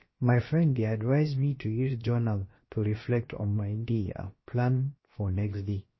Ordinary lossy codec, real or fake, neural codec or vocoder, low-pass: MP3, 24 kbps; fake; codec, 16 kHz, 0.7 kbps, FocalCodec; 7.2 kHz